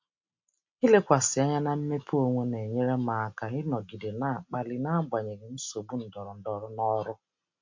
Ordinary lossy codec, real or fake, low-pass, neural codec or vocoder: MP3, 48 kbps; real; 7.2 kHz; none